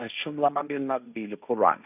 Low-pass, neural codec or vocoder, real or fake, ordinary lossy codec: 3.6 kHz; codec, 16 kHz, 1.1 kbps, Voila-Tokenizer; fake; none